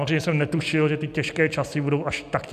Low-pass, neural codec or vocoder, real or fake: 14.4 kHz; none; real